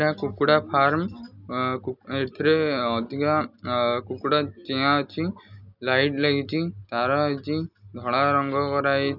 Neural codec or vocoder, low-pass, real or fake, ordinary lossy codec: none; 5.4 kHz; real; none